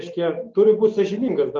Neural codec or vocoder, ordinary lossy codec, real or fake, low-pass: none; Opus, 24 kbps; real; 7.2 kHz